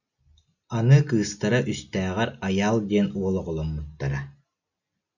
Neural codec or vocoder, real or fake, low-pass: none; real; 7.2 kHz